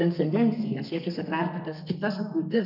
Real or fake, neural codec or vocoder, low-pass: fake; codec, 32 kHz, 1.9 kbps, SNAC; 5.4 kHz